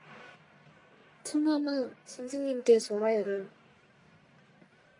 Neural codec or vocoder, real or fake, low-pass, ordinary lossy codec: codec, 44.1 kHz, 1.7 kbps, Pupu-Codec; fake; 10.8 kHz; AAC, 48 kbps